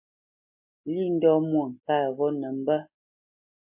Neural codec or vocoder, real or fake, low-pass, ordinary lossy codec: none; real; 3.6 kHz; MP3, 32 kbps